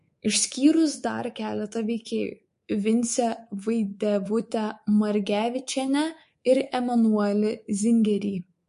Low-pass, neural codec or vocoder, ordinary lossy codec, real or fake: 10.8 kHz; codec, 24 kHz, 3.1 kbps, DualCodec; MP3, 48 kbps; fake